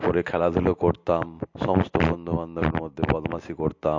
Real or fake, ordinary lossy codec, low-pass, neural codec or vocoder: real; MP3, 48 kbps; 7.2 kHz; none